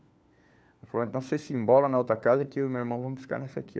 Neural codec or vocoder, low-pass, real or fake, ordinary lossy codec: codec, 16 kHz, 4 kbps, FunCodec, trained on LibriTTS, 50 frames a second; none; fake; none